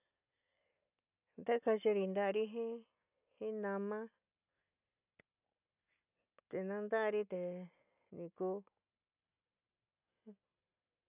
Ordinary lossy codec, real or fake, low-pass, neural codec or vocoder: none; real; 3.6 kHz; none